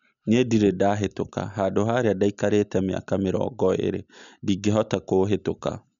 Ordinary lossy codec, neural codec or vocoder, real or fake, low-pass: none; none; real; 7.2 kHz